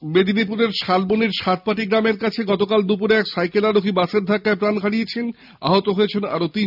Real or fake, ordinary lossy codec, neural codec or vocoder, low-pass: real; none; none; 5.4 kHz